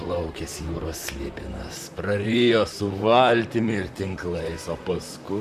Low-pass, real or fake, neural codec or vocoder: 14.4 kHz; fake; vocoder, 44.1 kHz, 128 mel bands, Pupu-Vocoder